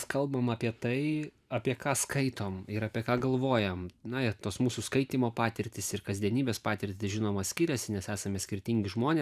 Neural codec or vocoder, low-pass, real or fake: vocoder, 44.1 kHz, 128 mel bands every 512 samples, BigVGAN v2; 14.4 kHz; fake